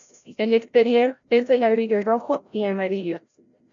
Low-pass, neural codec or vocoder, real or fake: 7.2 kHz; codec, 16 kHz, 0.5 kbps, FreqCodec, larger model; fake